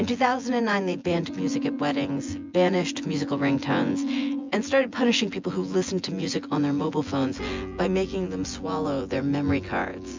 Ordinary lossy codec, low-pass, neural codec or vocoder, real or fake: MP3, 64 kbps; 7.2 kHz; vocoder, 24 kHz, 100 mel bands, Vocos; fake